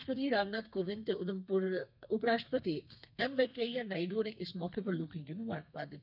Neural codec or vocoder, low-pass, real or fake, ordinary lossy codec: codec, 32 kHz, 1.9 kbps, SNAC; 5.4 kHz; fake; none